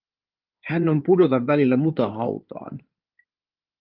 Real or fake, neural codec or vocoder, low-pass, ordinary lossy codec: fake; codec, 16 kHz in and 24 kHz out, 2.2 kbps, FireRedTTS-2 codec; 5.4 kHz; Opus, 24 kbps